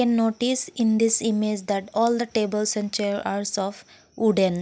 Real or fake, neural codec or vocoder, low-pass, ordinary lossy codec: real; none; none; none